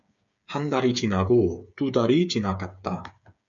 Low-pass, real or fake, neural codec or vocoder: 7.2 kHz; fake; codec, 16 kHz, 8 kbps, FreqCodec, smaller model